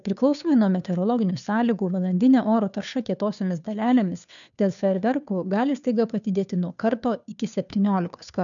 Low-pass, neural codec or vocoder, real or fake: 7.2 kHz; codec, 16 kHz, 2 kbps, FunCodec, trained on Chinese and English, 25 frames a second; fake